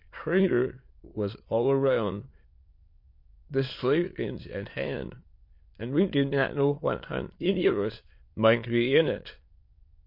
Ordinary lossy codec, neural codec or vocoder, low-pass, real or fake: MP3, 32 kbps; autoencoder, 22.05 kHz, a latent of 192 numbers a frame, VITS, trained on many speakers; 5.4 kHz; fake